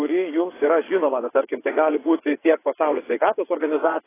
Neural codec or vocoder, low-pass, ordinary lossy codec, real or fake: vocoder, 22.05 kHz, 80 mel bands, WaveNeXt; 3.6 kHz; AAC, 16 kbps; fake